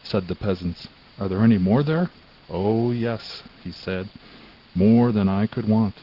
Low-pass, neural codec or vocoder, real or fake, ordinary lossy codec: 5.4 kHz; none; real; Opus, 16 kbps